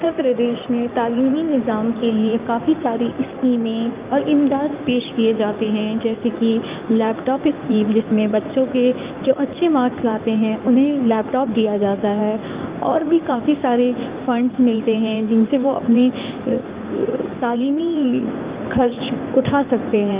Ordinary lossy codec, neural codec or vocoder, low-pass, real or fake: Opus, 24 kbps; codec, 16 kHz in and 24 kHz out, 2.2 kbps, FireRedTTS-2 codec; 3.6 kHz; fake